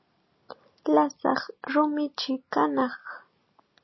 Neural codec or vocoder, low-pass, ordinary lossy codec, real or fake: none; 7.2 kHz; MP3, 24 kbps; real